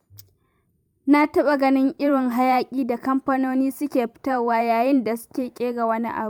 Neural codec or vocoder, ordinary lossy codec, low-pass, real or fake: vocoder, 44.1 kHz, 128 mel bands every 512 samples, BigVGAN v2; MP3, 96 kbps; 19.8 kHz; fake